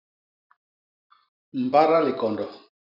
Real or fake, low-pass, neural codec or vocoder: fake; 5.4 kHz; vocoder, 44.1 kHz, 128 mel bands every 512 samples, BigVGAN v2